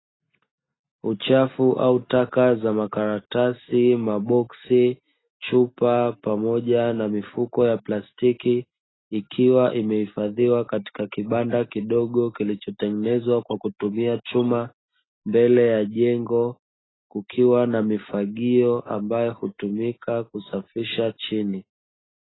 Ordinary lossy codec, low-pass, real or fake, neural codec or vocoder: AAC, 16 kbps; 7.2 kHz; real; none